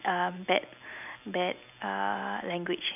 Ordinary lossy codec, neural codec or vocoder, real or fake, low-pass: none; none; real; 3.6 kHz